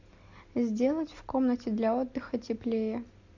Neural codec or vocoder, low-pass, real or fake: none; 7.2 kHz; real